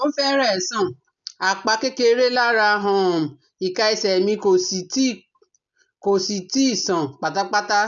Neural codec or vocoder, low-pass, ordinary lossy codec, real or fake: none; 7.2 kHz; none; real